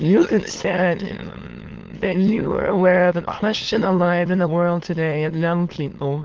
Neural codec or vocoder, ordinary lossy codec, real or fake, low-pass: autoencoder, 22.05 kHz, a latent of 192 numbers a frame, VITS, trained on many speakers; Opus, 16 kbps; fake; 7.2 kHz